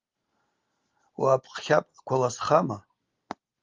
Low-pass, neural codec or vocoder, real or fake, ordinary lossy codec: 7.2 kHz; none; real; Opus, 32 kbps